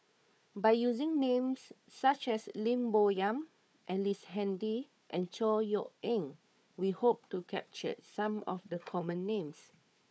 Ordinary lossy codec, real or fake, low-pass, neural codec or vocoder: none; fake; none; codec, 16 kHz, 4 kbps, FunCodec, trained on Chinese and English, 50 frames a second